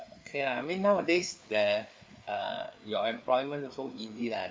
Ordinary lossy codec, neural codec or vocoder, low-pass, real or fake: none; codec, 16 kHz, 4 kbps, FreqCodec, larger model; none; fake